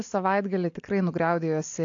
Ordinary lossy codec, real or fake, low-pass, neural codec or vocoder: AAC, 48 kbps; real; 7.2 kHz; none